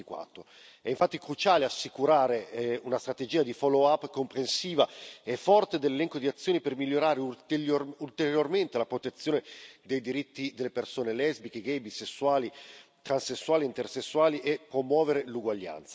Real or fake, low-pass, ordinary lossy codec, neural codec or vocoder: real; none; none; none